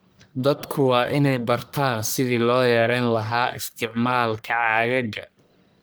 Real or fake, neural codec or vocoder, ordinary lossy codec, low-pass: fake; codec, 44.1 kHz, 1.7 kbps, Pupu-Codec; none; none